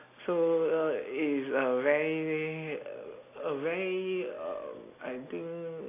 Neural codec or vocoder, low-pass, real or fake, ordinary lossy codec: none; 3.6 kHz; real; AAC, 24 kbps